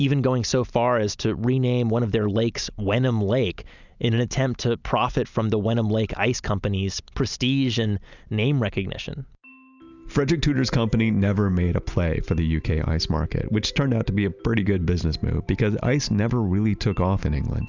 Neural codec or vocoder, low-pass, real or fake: none; 7.2 kHz; real